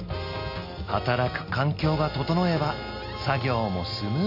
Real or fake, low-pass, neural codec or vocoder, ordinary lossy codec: real; 5.4 kHz; none; none